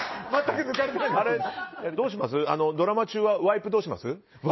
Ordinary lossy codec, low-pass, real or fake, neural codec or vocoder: MP3, 24 kbps; 7.2 kHz; real; none